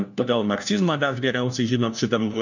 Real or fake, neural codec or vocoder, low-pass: fake; codec, 16 kHz, 1 kbps, FunCodec, trained on LibriTTS, 50 frames a second; 7.2 kHz